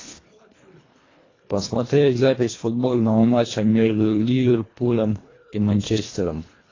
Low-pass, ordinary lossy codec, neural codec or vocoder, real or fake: 7.2 kHz; AAC, 32 kbps; codec, 24 kHz, 1.5 kbps, HILCodec; fake